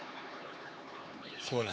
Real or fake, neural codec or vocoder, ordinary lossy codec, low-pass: fake; codec, 16 kHz, 4 kbps, X-Codec, HuBERT features, trained on LibriSpeech; none; none